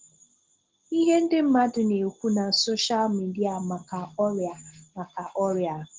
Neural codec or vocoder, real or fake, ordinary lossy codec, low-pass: none; real; Opus, 16 kbps; 7.2 kHz